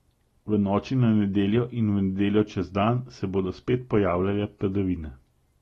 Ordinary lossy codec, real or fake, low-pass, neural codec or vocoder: AAC, 32 kbps; real; 19.8 kHz; none